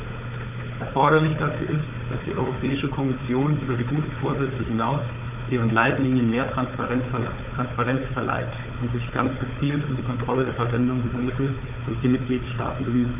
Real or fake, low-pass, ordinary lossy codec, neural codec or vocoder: fake; 3.6 kHz; none; codec, 16 kHz, 4 kbps, FunCodec, trained on Chinese and English, 50 frames a second